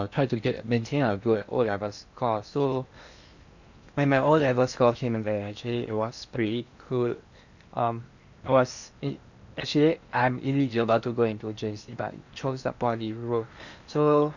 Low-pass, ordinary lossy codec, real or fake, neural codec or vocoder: 7.2 kHz; none; fake; codec, 16 kHz in and 24 kHz out, 0.8 kbps, FocalCodec, streaming, 65536 codes